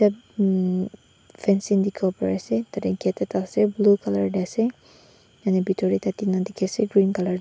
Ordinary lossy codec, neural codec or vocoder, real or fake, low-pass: none; none; real; none